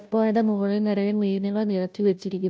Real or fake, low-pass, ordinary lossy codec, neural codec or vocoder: fake; none; none; codec, 16 kHz, 0.5 kbps, FunCodec, trained on Chinese and English, 25 frames a second